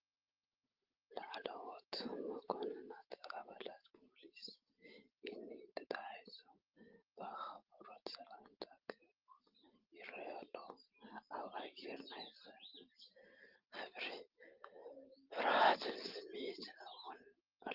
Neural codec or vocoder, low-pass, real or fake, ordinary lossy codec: none; 5.4 kHz; real; Opus, 32 kbps